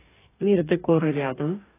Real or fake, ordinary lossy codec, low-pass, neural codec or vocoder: fake; AAC, 16 kbps; 3.6 kHz; codec, 44.1 kHz, 2.6 kbps, DAC